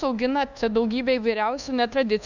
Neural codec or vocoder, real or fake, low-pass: codec, 24 kHz, 1.2 kbps, DualCodec; fake; 7.2 kHz